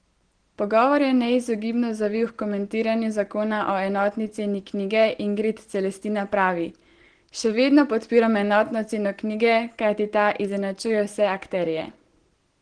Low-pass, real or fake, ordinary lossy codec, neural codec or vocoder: 9.9 kHz; real; Opus, 16 kbps; none